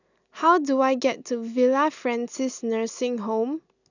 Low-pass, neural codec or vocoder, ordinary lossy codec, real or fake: 7.2 kHz; none; none; real